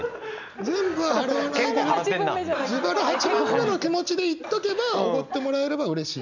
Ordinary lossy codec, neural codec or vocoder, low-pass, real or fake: none; none; 7.2 kHz; real